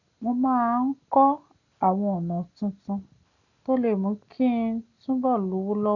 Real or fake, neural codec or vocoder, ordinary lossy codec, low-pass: real; none; none; 7.2 kHz